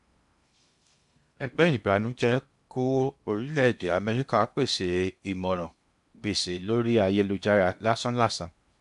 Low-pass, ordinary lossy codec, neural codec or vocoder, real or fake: 10.8 kHz; AAC, 96 kbps; codec, 16 kHz in and 24 kHz out, 0.8 kbps, FocalCodec, streaming, 65536 codes; fake